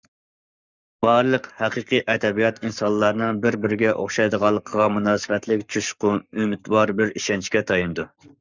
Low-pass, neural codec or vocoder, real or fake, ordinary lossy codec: 7.2 kHz; codec, 44.1 kHz, 7.8 kbps, Pupu-Codec; fake; Opus, 64 kbps